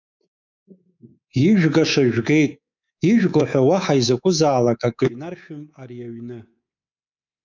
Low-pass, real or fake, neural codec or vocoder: 7.2 kHz; fake; codec, 24 kHz, 3.1 kbps, DualCodec